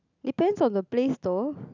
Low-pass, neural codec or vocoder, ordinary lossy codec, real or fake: 7.2 kHz; none; none; real